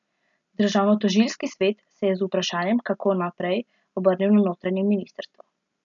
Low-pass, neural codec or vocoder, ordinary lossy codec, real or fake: 7.2 kHz; none; none; real